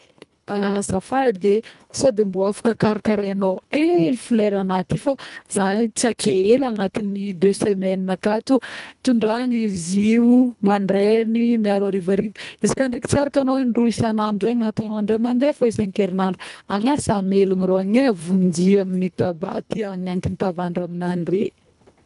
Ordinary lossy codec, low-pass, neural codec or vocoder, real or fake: none; 10.8 kHz; codec, 24 kHz, 1.5 kbps, HILCodec; fake